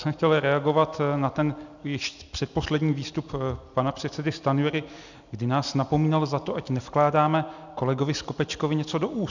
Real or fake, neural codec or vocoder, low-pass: real; none; 7.2 kHz